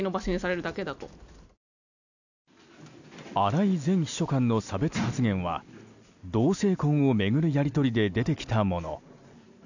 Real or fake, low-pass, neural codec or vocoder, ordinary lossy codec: real; 7.2 kHz; none; none